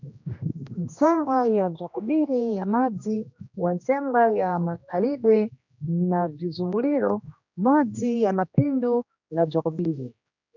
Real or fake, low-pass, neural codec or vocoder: fake; 7.2 kHz; codec, 16 kHz, 1 kbps, X-Codec, HuBERT features, trained on general audio